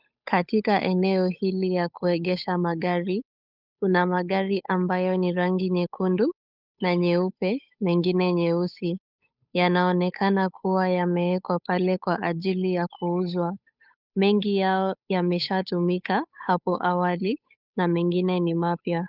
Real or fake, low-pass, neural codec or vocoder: fake; 5.4 kHz; codec, 16 kHz, 8 kbps, FunCodec, trained on Chinese and English, 25 frames a second